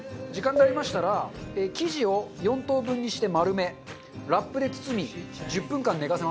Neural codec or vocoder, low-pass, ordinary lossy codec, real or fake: none; none; none; real